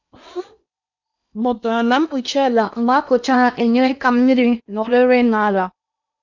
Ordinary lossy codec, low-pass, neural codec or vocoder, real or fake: none; 7.2 kHz; codec, 16 kHz in and 24 kHz out, 0.8 kbps, FocalCodec, streaming, 65536 codes; fake